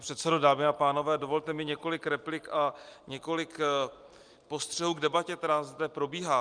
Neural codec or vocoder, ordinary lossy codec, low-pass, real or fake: none; Opus, 32 kbps; 9.9 kHz; real